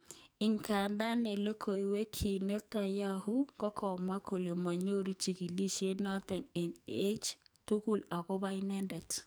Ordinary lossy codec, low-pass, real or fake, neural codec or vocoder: none; none; fake; codec, 44.1 kHz, 2.6 kbps, SNAC